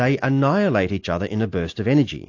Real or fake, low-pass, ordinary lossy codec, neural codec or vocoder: real; 7.2 kHz; AAC, 48 kbps; none